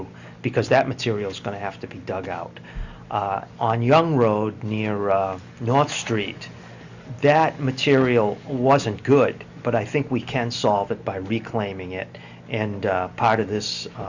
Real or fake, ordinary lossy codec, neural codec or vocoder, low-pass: real; Opus, 64 kbps; none; 7.2 kHz